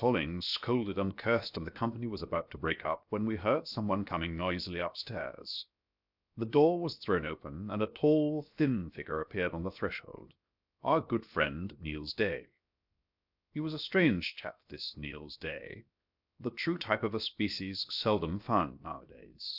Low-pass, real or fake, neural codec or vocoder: 5.4 kHz; fake; codec, 16 kHz, 0.7 kbps, FocalCodec